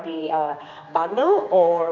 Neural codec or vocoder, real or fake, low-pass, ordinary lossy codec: codec, 16 kHz, 2 kbps, X-Codec, HuBERT features, trained on general audio; fake; 7.2 kHz; none